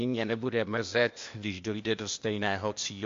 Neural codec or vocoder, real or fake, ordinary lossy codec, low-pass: codec, 16 kHz, 0.8 kbps, ZipCodec; fake; MP3, 48 kbps; 7.2 kHz